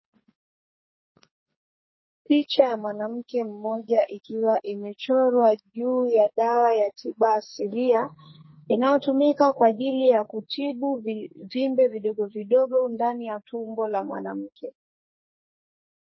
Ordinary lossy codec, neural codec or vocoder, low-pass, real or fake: MP3, 24 kbps; codec, 44.1 kHz, 2.6 kbps, SNAC; 7.2 kHz; fake